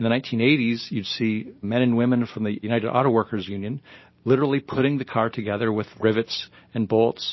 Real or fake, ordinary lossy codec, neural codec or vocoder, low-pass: real; MP3, 24 kbps; none; 7.2 kHz